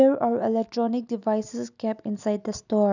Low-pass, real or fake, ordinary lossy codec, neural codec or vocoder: 7.2 kHz; real; none; none